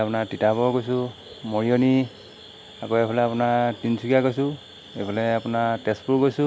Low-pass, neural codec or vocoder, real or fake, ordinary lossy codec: none; none; real; none